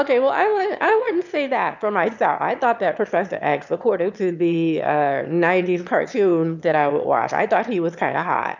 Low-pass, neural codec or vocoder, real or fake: 7.2 kHz; autoencoder, 22.05 kHz, a latent of 192 numbers a frame, VITS, trained on one speaker; fake